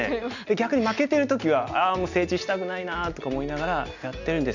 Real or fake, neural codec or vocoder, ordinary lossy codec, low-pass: real; none; none; 7.2 kHz